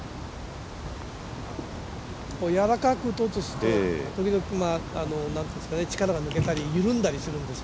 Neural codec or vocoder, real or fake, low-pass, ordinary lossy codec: none; real; none; none